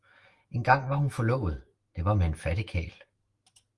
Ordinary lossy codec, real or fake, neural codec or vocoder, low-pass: Opus, 24 kbps; fake; autoencoder, 48 kHz, 128 numbers a frame, DAC-VAE, trained on Japanese speech; 10.8 kHz